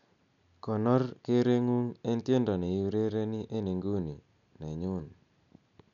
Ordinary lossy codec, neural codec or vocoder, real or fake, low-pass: none; none; real; 7.2 kHz